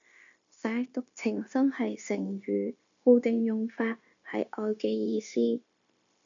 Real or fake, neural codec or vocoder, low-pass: fake; codec, 16 kHz, 0.9 kbps, LongCat-Audio-Codec; 7.2 kHz